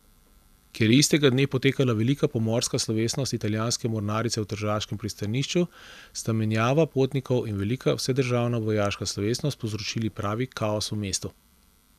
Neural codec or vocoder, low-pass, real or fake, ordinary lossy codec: none; 14.4 kHz; real; none